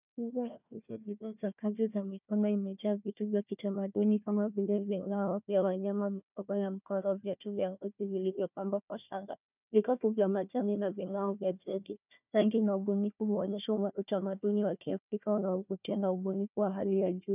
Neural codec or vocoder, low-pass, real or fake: codec, 16 kHz, 1 kbps, FunCodec, trained on Chinese and English, 50 frames a second; 3.6 kHz; fake